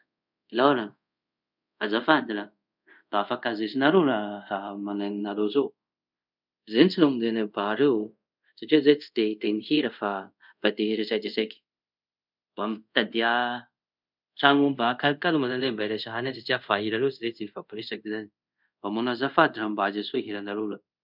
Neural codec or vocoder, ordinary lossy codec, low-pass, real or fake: codec, 24 kHz, 0.5 kbps, DualCodec; none; 5.4 kHz; fake